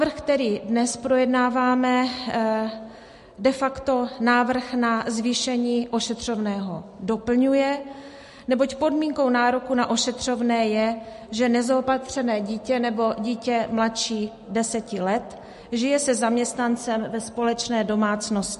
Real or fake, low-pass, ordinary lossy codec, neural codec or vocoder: real; 14.4 kHz; MP3, 48 kbps; none